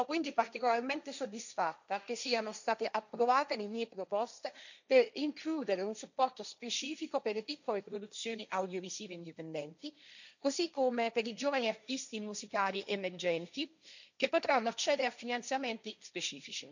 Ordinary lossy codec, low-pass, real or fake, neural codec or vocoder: none; 7.2 kHz; fake; codec, 16 kHz, 1.1 kbps, Voila-Tokenizer